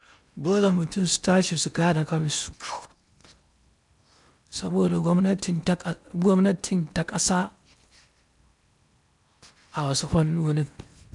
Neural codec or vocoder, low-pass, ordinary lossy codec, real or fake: codec, 16 kHz in and 24 kHz out, 0.8 kbps, FocalCodec, streaming, 65536 codes; 10.8 kHz; none; fake